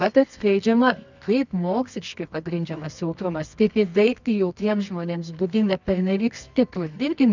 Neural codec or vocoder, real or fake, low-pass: codec, 24 kHz, 0.9 kbps, WavTokenizer, medium music audio release; fake; 7.2 kHz